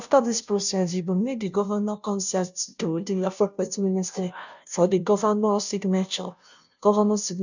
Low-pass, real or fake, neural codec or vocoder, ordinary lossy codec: 7.2 kHz; fake; codec, 16 kHz, 0.5 kbps, FunCodec, trained on Chinese and English, 25 frames a second; none